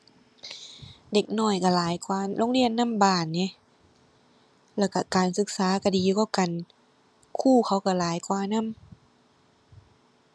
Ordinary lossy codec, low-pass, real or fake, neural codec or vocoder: none; none; real; none